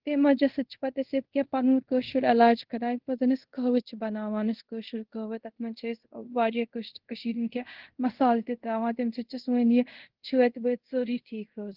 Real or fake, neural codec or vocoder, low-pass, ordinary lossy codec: fake; codec, 24 kHz, 0.5 kbps, DualCodec; 5.4 kHz; Opus, 16 kbps